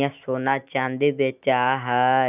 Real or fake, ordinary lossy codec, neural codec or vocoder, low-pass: real; none; none; 3.6 kHz